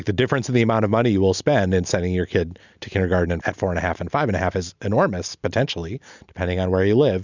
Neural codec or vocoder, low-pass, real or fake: none; 7.2 kHz; real